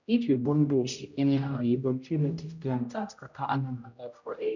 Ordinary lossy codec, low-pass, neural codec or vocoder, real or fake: none; 7.2 kHz; codec, 16 kHz, 0.5 kbps, X-Codec, HuBERT features, trained on general audio; fake